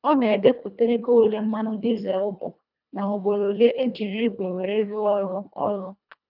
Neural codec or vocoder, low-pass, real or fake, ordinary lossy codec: codec, 24 kHz, 1.5 kbps, HILCodec; 5.4 kHz; fake; none